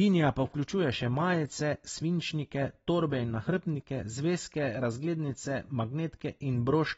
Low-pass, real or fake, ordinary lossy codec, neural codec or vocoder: 9.9 kHz; real; AAC, 24 kbps; none